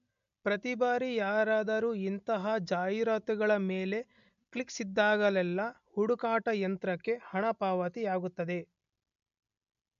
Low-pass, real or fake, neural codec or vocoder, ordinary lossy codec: 7.2 kHz; real; none; MP3, 64 kbps